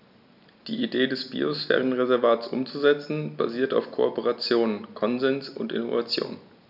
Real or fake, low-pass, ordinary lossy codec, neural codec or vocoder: real; 5.4 kHz; none; none